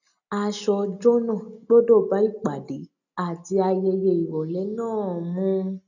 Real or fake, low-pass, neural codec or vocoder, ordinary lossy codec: real; 7.2 kHz; none; none